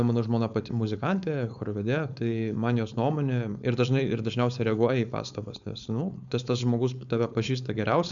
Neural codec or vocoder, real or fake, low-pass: codec, 16 kHz, 4.8 kbps, FACodec; fake; 7.2 kHz